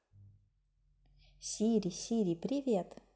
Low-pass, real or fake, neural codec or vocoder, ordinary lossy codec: none; real; none; none